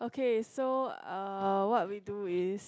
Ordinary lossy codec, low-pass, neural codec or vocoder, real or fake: none; none; none; real